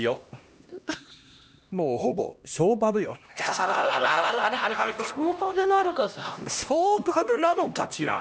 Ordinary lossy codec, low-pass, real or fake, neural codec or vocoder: none; none; fake; codec, 16 kHz, 1 kbps, X-Codec, HuBERT features, trained on LibriSpeech